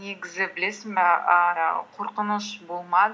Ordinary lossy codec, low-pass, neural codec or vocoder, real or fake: none; none; none; real